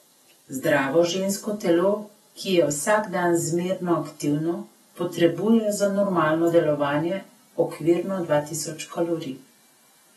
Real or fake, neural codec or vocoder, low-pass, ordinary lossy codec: real; none; 19.8 kHz; AAC, 32 kbps